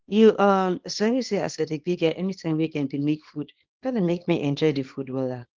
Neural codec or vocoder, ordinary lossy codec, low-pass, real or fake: codec, 24 kHz, 0.9 kbps, WavTokenizer, small release; Opus, 16 kbps; 7.2 kHz; fake